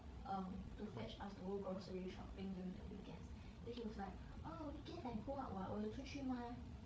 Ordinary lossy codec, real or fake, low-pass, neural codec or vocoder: none; fake; none; codec, 16 kHz, 8 kbps, FreqCodec, larger model